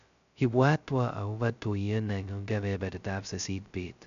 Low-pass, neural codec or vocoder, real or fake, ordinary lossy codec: 7.2 kHz; codec, 16 kHz, 0.2 kbps, FocalCodec; fake; none